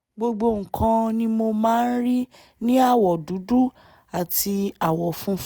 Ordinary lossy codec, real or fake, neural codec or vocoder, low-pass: none; real; none; none